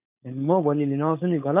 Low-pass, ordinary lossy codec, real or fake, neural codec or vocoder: 3.6 kHz; none; fake; codec, 16 kHz, 4.8 kbps, FACodec